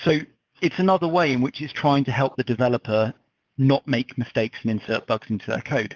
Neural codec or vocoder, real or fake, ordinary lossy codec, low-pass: codec, 44.1 kHz, 7.8 kbps, DAC; fake; Opus, 24 kbps; 7.2 kHz